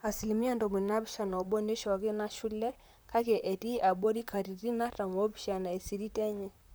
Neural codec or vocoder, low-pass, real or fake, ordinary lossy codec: vocoder, 44.1 kHz, 128 mel bands, Pupu-Vocoder; none; fake; none